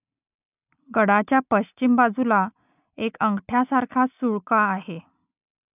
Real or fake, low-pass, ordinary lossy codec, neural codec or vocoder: real; 3.6 kHz; none; none